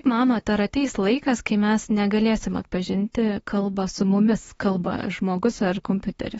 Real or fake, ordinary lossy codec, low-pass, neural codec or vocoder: fake; AAC, 24 kbps; 19.8 kHz; autoencoder, 48 kHz, 32 numbers a frame, DAC-VAE, trained on Japanese speech